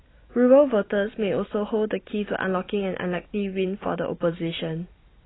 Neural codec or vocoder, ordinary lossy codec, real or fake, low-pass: none; AAC, 16 kbps; real; 7.2 kHz